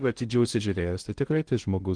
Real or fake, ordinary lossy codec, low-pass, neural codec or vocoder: fake; Opus, 24 kbps; 9.9 kHz; codec, 16 kHz in and 24 kHz out, 0.6 kbps, FocalCodec, streaming, 2048 codes